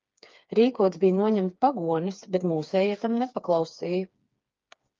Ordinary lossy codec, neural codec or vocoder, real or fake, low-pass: Opus, 24 kbps; codec, 16 kHz, 4 kbps, FreqCodec, smaller model; fake; 7.2 kHz